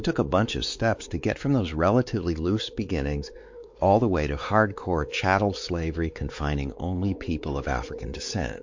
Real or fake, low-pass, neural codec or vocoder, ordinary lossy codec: fake; 7.2 kHz; codec, 16 kHz, 4 kbps, X-Codec, WavLM features, trained on Multilingual LibriSpeech; MP3, 48 kbps